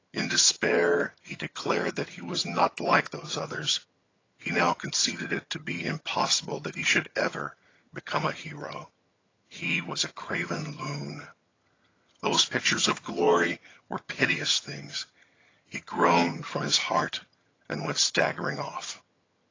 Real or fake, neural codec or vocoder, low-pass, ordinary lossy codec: fake; vocoder, 22.05 kHz, 80 mel bands, HiFi-GAN; 7.2 kHz; AAC, 32 kbps